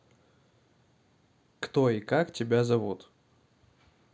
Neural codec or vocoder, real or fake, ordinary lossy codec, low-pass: none; real; none; none